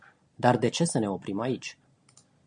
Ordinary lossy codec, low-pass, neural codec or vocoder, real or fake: MP3, 96 kbps; 9.9 kHz; none; real